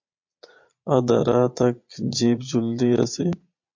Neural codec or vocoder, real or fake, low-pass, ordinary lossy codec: vocoder, 22.05 kHz, 80 mel bands, Vocos; fake; 7.2 kHz; MP3, 48 kbps